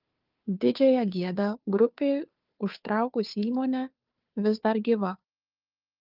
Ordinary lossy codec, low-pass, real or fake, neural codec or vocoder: Opus, 32 kbps; 5.4 kHz; fake; codec, 16 kHz, 2 kbps, FunCodec, trained on Chinese and English, 25 frames a second